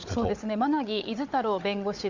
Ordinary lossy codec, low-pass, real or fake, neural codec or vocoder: Opus, 64 kbps; 7.2 kHz; fake; codec, 16 kHz, 16 kbps, FunCodec, trained on Chinese and English, 50 frames a second